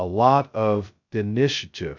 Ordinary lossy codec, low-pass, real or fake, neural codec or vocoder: MP3, 64 kbps; 7.2 kHz; fake; codec, 16 kHz, 0.2 kbps, FocalCodec